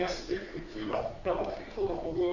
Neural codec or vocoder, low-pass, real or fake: codec, 24 kHz, 1 kbps, SNAC; 7.2 kHz; fake